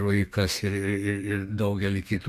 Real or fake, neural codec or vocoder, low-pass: fake; codec, 32 kHz, 1.9 kbps, SNAC; 14.4 kHz